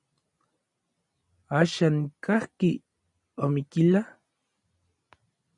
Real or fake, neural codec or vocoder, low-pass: real; none; 10.8 kHz